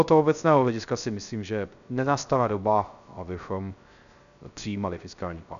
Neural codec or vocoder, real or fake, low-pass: codec, 16 kHz, 0.3 kbps, FocalCodec; fake; 7.2 kHz